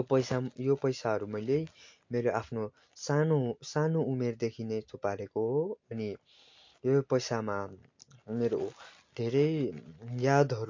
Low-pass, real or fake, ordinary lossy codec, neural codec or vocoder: 7.2 kHz; real; MP3, 48 kbps; none